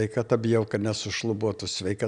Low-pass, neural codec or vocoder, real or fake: 9.9 kHz; none; real